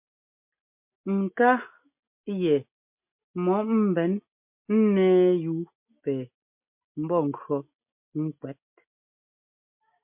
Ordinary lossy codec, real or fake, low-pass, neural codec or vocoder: MP3, 32 kbps; real; 3.6 kHz; none